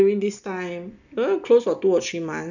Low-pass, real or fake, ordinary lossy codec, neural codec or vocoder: 7.2 kHz; real; none; none